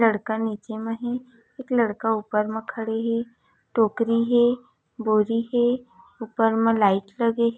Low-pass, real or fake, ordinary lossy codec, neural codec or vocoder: none; real; none; none